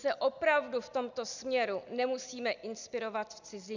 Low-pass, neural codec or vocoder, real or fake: 7.2 kHz; none; real